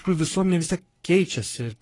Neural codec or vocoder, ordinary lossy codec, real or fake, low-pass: codec, 44.1 kHz, 3.4 kbps, Pupu-Codec; AAC, 32 kbps; fake; 10.8 kHz